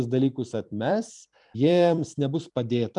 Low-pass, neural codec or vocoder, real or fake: 10.8 kHz; vocoder, 44.1 kHz, 128 mel bands every 256 samples, BigVGAN v2; fake